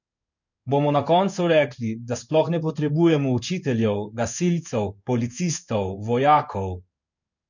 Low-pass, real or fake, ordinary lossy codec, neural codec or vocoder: 7.2 kHz; fake; none; codec, 16 kHz in and 24 kHz out, 1 kbps, XY-Tokenizer